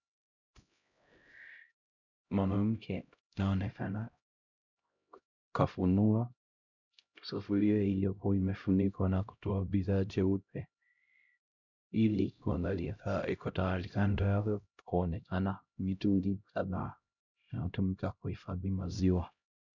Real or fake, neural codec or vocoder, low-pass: fake; codec, 16 kHz, 0.5 kbps, X-Codec, HuBERT features, trained on LibriSpeech; 7.2 kHz